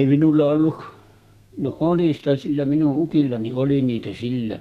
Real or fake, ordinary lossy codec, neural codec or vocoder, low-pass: fake; none; codec, 32 kHz, 1.9 kbps, SNAC; 14.4 kHz